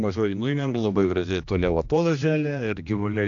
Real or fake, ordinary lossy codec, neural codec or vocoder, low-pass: fake; AAC, 64 kbps; codec, 16 kHz, 1 kbps, X-Codec, HuBERT features, trained on general audio; 7.2 kHz